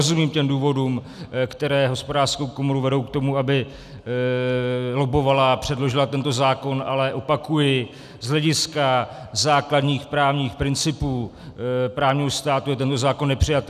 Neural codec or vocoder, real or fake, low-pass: none; real; 14.4 kHz